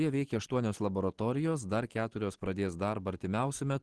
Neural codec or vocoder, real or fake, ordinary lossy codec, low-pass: none; real; Opus, 16 kbps; 10.8 kHz